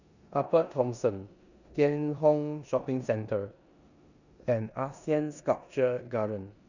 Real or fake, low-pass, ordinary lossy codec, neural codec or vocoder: fake; 7.2 kHz; none; codec, 16 kHz in and 24 kHz out, 0.9 kbps, LongCat-Audio-Codec, four codebook decoder